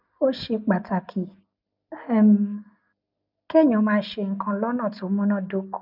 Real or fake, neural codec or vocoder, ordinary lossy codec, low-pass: real; none; none; 5.4 kHz